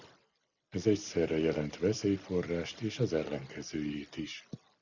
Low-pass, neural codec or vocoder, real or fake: 7.2 kHz; none; real